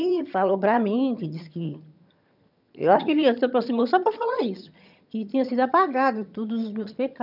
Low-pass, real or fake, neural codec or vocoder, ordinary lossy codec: 5.4 kHz; fake; vocoder, 22.05 kHz, 80 mel bands, HiFi-GAN; none